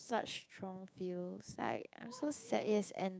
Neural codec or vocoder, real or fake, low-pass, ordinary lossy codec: codec, 16 kHz, 6 kbps, DAC; fake; none; none